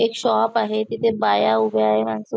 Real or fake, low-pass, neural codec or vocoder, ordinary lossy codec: real; none; none; none